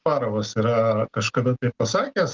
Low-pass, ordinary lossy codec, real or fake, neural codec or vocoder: 7.2 kHz; Opus, 24 kbps; real; none